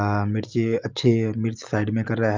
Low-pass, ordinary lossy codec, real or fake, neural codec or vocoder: 7.2 kHz; Opus, 24 kbps; real; none